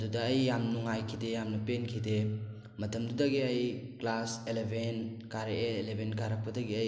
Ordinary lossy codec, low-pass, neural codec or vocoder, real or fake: none; none; none; real